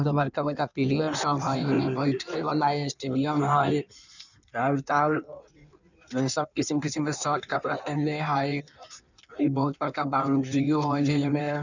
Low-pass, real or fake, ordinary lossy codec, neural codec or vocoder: 7.2 kHz; fake; none; codec, 16 kHz in and 24 kHz out, 1.1 kbps, FireRedTTS-2 codec